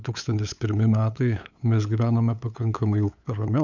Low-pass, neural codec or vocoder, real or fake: 7.2 kHz; codec, 16 kHz, 8 kbps, FunCodec, trained on LibriTTS, 25 frames a second; fake